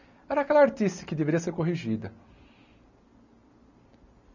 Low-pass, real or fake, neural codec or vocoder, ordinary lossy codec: 7.2 kHz; real; none; none